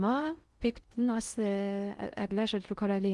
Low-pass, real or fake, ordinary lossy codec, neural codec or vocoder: 10.8 kHz; fake; Opus, 32 kbps; codec, 16 kHz in and 24 kHz out, 0.6 kbps, FocalCodec, streaming, 2048 codes